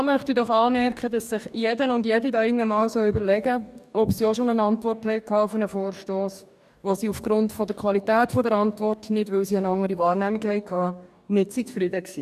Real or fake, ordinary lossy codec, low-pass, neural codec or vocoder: fake; none; 14.4 kHz; codec, 44.1 kHz, 2.6 kbps, DAC